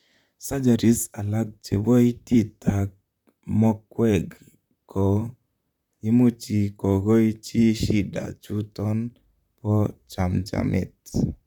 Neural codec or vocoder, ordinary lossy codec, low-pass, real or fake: vocoder, 44.1 kHz, 128 mel bands, Pupu-Vocoder; none; 19.8 kHz; fake